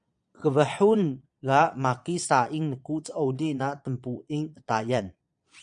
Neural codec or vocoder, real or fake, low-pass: vocoder, 22.05 kHz, 80 mel bands, Vocos; fake; 9.9 kHz